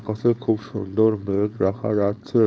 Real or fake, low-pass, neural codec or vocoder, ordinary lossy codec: fake; none; codec, 16 kHz, 4.8 kbps, FACodec; none